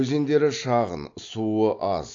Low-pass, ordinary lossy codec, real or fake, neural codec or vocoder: 7.2 kHz; AAC, 48 kbps; real; none